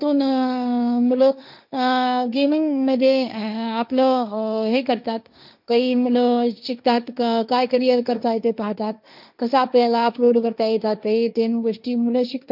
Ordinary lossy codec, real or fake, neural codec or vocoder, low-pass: none; fake; codec, 16 kHz, 1.1 kbps, Voila-Tokenizer; 5.4 kHz